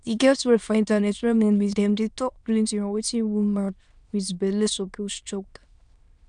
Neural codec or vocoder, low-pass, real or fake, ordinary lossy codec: autoencoder, 22.05 kHz, a latent of 192 numbers a frame, VITS, trained on many speakers; 9.9 kHz; fake; none